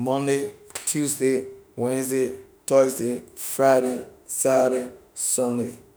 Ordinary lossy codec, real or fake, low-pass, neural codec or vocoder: none; fake; none; autoencoder, 48 kHz, 32 numbers a frame, DAC-VAE, trained on Japanese speech